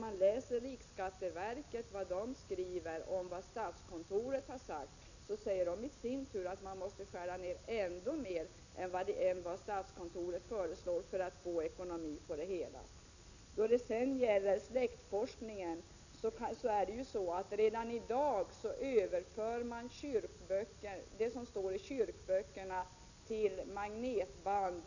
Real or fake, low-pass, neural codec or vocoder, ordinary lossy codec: real; 7.2 kHz; none; none